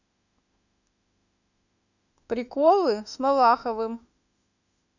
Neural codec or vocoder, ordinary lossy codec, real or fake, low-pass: autoencoder, 48 kHz, 32 numbers a frame, DAC-VAE, trained on Japanese speech; none; fake; 7.2 kHz